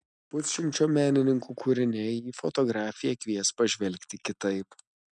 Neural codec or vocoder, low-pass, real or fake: none; 9.9 kHz; real